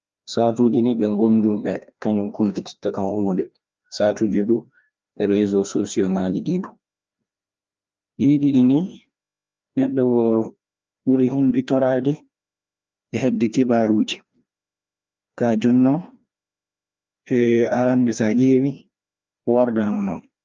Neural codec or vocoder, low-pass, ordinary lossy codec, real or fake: codec, 16 kHz, 1 kbps, FreqCodec, larger model; 7.2 kHz; Opus, 24 kbps; fake